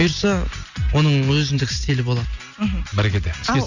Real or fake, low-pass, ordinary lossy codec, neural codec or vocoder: real; 7.2 kHz; none; none